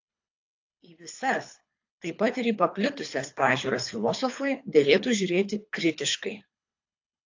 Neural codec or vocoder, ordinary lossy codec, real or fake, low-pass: codec, 24 kHz, 3 kbps, HILCodec; AAC, 48 kbps; fake; 7.2 kHz